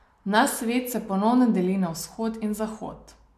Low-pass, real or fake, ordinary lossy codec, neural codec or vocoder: 14.4 kHz; real; MP3, 96 kbps; none